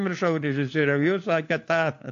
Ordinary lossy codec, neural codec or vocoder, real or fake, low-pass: AAC, 48 kbps; codec, 16 kHz, 4 kbps, FunCodec, trained on LibriTTS, 50 frames a second; fake; 7.2 kHz